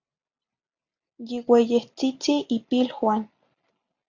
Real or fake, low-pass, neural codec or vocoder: real; 7.2 kHz; none